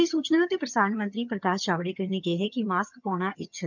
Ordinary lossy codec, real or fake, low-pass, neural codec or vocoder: none; fake; 7.2 kHz; vocoder, 22.05 kHz, 80 mel bands, HiFi-GAN